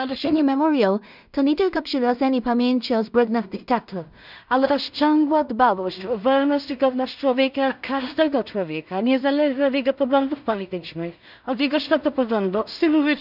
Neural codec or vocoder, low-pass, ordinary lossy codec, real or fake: codec, 16 kHz in and 24 kHz out, 0.4 kbps, LongCat-Audio-Codec, two codebook decoder; 5.4 kHz; none; fake